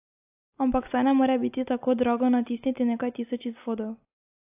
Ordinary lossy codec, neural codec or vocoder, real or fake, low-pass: AAC, 32 kbps; none; real; 3.6 kHz